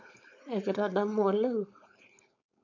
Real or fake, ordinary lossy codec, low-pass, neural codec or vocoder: fake; none; 7.2 kHz; codec, 16 kHz, 4.8 kbps, FACodec